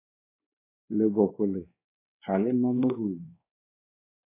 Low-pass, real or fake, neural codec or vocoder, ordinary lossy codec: 3.6 kHz; fake; codec, 16 kHz, 2 kbps, X-Codec, WavLM features, trained on Multilingual LibriSpeech; AAC, 24 kbps